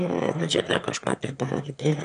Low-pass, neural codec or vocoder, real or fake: 9.9 kHz; autoencoder, 22.05 kHz, a latent of 192 numbers a frame, VITS, trained on one speaker; fake